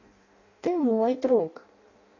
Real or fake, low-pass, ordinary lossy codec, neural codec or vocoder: fake; 7.2 kHz; AAC, 32 kbps; codec, 16 kHz in and 24 kHz out, 0.6 kbps, FireRedTTS-2 codec